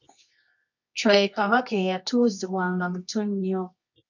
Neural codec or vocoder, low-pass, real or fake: codec, 24 kHz, 0.9 kbps, WavTokenizer, medium music audio release; 7.2 kHz; fake